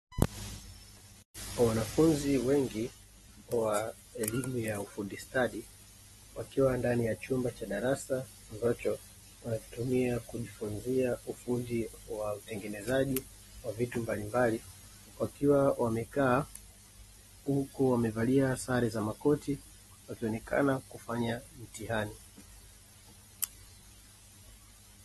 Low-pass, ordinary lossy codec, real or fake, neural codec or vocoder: 14.4 kHz; AAC, 32 kbps; real; none